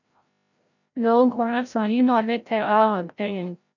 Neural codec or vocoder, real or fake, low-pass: codec, 16 kHz, 0.5 kbps, FreqCodec, larger model; fake; 7.2 kHz